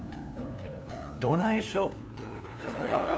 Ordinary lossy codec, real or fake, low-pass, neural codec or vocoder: none; fake; none; codec, 16 kHz, 2 kbps, FunCodec, trained on LibriTTS, 25 frames a second